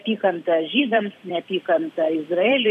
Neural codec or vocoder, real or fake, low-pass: vocoder, 44.1 kHz, 128 mel bands every 256 samples, BigVGAN v2; fake; 14.4 kHz